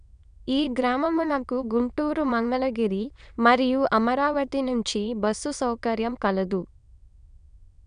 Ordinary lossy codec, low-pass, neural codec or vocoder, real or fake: none; 9.9 kHz; autoencoder, 22.05 kHz, a latent of 192 numbers a frame, VITS, trained on many speakers; fake